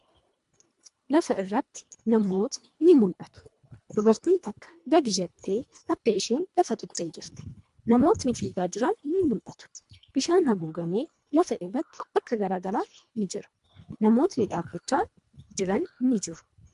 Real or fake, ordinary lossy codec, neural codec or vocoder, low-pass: fake; MP3, 64 kbps; codec, 24 kHz, 1.5 kbps, HILCodec; 10.8 kHz